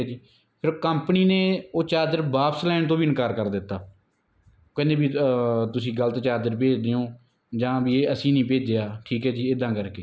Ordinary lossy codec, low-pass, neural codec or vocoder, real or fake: none; none; none; real